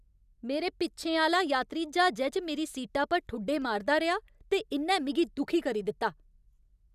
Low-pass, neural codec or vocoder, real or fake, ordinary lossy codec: 14.4 kHz; none; real; none